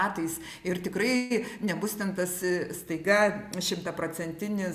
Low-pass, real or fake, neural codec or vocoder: 14.4 kHz; real; none